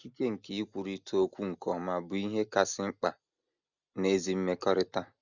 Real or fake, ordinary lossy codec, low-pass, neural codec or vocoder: real; none; 7.2 kHz; none